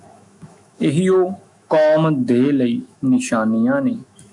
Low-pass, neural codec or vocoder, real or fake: 10.8 kHz; autoencoder, 48 kHz, 128 numbers a frame, DAC-VAE, trained on Japanese speech; fake